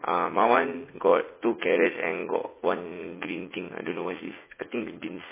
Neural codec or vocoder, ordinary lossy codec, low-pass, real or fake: vocoder, 44.1 kHz, 80 mel bands, Vocos; MP3, 16 kbps; 3.6 kHz; fake